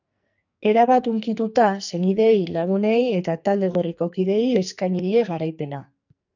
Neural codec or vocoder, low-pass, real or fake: codec, 44.1 kHz, 2.6 kbps, SNAC; 7.2 kHz; fake